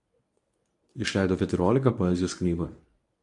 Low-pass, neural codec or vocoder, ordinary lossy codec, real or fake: 10.8 kHz; codec, 24 kHz, 0.9 kbps, WavTokenizer, medium speech release version 1; AAC, 64 kbps; fake